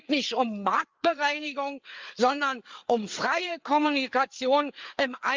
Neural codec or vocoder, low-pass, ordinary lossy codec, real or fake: codec, 16 kHz, 4 kbps, FreqCodec, larger model; 7.2 kHz; Opus, 32 kbps; fake